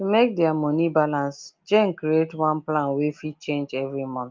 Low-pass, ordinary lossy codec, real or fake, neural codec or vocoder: 7.2 kHz; Opus, 24 kbps; real; none